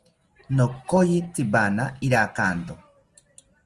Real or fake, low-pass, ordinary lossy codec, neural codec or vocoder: real; 10.8 kHz; Opus, 24 kbps; none